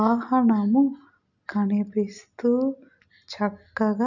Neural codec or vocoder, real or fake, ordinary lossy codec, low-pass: none; real; none; 7.2 kHz